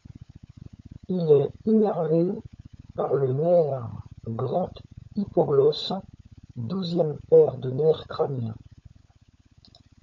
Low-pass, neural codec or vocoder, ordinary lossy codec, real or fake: 7.2 kHz; codec, 16 kHz, 16 kbps, FunCodec, trained on LibriTTS, 50 frames a second; MP3, 48 kbps; fake